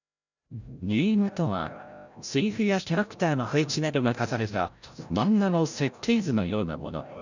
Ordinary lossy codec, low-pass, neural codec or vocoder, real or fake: none; 7.2 kHz; codec, 16 kHz, 0.5 kbps, FreqCodec, larger model; fake